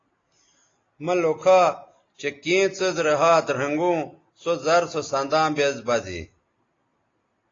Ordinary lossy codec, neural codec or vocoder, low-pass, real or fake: AAC, 32 kbps; none; 7.2 kHz; real